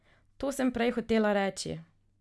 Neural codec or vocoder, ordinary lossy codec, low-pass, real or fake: none; none; none; real